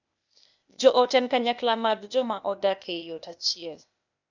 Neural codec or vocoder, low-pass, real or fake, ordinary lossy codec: codec, 16 kHz, 0.8 kbps, ZipCodec; 7.2 kHz; fake; none